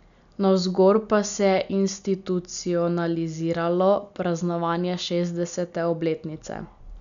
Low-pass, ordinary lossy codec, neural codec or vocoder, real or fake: 7.2 kHz; none; none; real